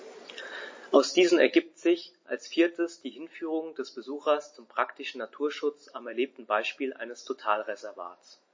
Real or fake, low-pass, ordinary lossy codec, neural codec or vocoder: real; 7.2 kHz; MP3, 32 kbps; none